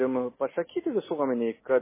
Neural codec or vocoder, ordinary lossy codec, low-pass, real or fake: none; MP3, 16 kbps; 3.6 kHz; real